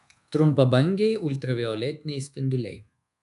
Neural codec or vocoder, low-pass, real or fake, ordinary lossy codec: codec, 24 kHz, 1.2 kbps, DualCodec; 10.8 kHz; fake; MP3, 96 kbps